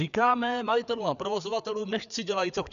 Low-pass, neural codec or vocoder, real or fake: 7.2 kHz; codec, 16 kHz, 4 kbps, FreqCodec, larger model; fake